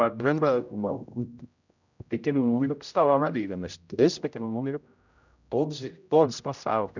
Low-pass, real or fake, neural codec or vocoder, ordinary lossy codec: 7.2 kHz; fake; codec, 16 kHz, 0.5 kbps, X-Codec, HuBERT features, trained on general audio; none